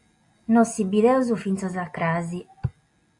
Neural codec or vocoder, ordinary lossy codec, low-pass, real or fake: none; AAC, 64 kbps; 10.8 kHz; real